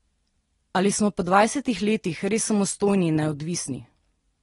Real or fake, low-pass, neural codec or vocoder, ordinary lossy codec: real; 10.8 kHz; none; AAC, 32 kbps